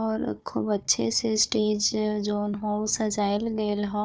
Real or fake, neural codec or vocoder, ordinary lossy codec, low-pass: fake; codec, 16 kHz, 4 kbps, FunCodec, trained on Chinese and English, 50 frames a second; none; none